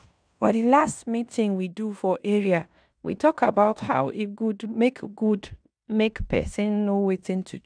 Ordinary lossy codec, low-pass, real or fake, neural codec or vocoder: none; 9.9 kHz; fake; codec, 16 kHz in and 24 kHz out, 0.9 kbps, LongCat-Audio-Codec, fine tuned four codebook decoder